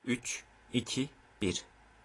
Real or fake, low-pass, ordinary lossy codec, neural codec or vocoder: real; 10.8 kHz; AAC, 32 kbps; none